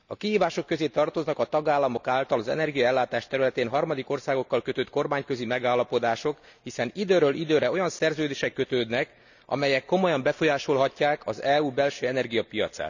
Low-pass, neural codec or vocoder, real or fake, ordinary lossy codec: 7.2 kHz; none; real; none